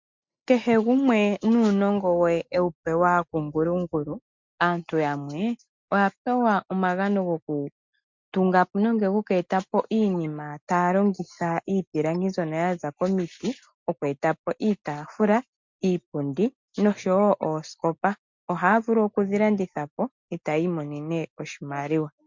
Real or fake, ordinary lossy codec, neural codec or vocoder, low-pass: real; MP3, 64 kbps; none; 7.2 kHz